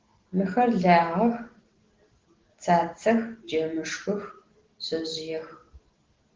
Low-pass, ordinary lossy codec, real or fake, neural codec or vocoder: 7.2 kHz; Opus, 16 kbps; real; none